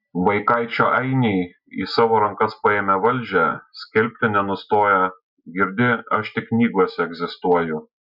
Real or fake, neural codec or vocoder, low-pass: real; none; 5.4 kHz